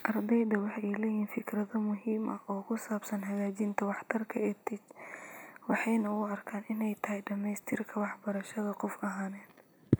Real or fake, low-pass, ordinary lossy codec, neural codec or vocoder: real; none; none; none